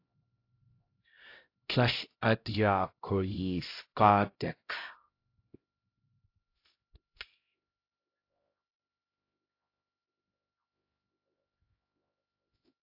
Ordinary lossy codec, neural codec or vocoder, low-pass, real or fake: AAC, 32 kbps; codec, 16 kHz, 0.5 kbps, X-Codec, HuBERT features, trained on LibriSpeech; 5.4 kHz; fake